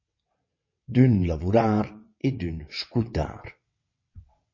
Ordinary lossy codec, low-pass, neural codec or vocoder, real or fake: MP3, 32 kbps; 7.2 kHz; vocoder, 22.05 kHz, 80 mel bands, WaveNeXt; fake